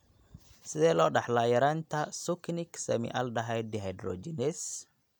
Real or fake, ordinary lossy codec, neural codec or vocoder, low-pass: real; none; none; 19.8 kHz